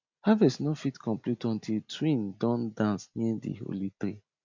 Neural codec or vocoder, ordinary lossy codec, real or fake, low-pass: none; MP3, 64 kbps; real; 7.2 kHz